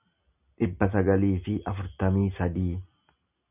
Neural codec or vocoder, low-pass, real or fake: none; 3.6 kHz; real